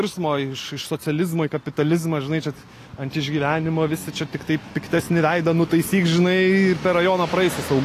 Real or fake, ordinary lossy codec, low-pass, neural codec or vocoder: real; AAC, 48 kbps; 14.4 kHz; none